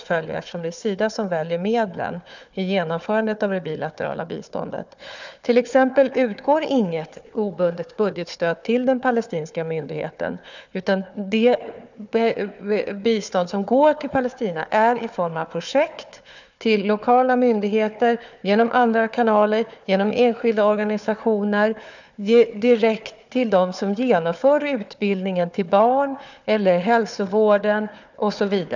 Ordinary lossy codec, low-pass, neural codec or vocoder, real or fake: none; 7.2 kHz; codec, 16 kHz, 4 kbps, FunCodec, trained on Chinese and English, 50 frames a second; fake